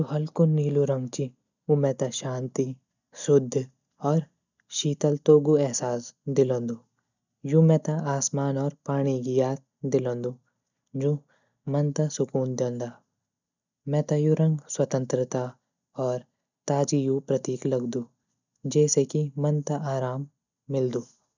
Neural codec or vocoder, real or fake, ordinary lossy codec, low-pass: none; real; none; 7.2 kHz